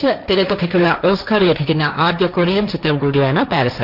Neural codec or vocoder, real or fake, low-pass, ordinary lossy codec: codec, 16 kHz, 1.1 kbps, Voila-Tokenizer; fake; 5.4 kHz; none